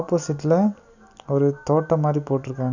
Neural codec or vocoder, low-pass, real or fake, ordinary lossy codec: none; 7.2 kHz; real; none